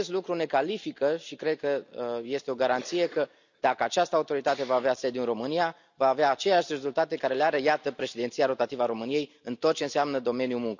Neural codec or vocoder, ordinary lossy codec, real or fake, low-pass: none; none; real; 7.2 kHz